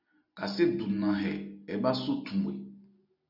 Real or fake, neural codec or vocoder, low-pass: real; none; 5.4 kHz